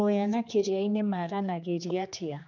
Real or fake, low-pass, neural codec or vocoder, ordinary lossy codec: fake; 7.2 kHz; codec, 16 kHz, 2 kbps, X-Codec, HuBERT features, trained on general audio; none